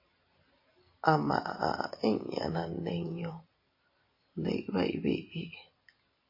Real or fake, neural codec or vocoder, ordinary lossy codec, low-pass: real; none; MP3, 24 kbps; 5.4 kHz